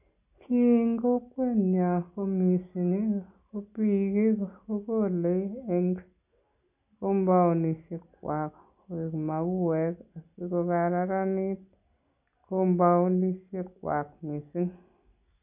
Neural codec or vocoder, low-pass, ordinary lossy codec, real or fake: none; 3.6 kHz; none; real